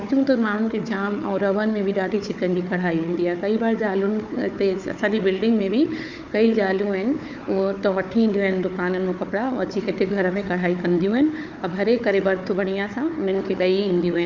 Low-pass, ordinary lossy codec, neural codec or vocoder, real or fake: 7.2 kHz; none; codec, 16 kHz, 4 kbps, FunCodec, trained on Chinese and English, 50 frames a second; fake